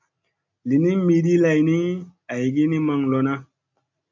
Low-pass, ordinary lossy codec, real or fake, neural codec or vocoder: 7.2 kHz; MP3, 64 kbps; real; none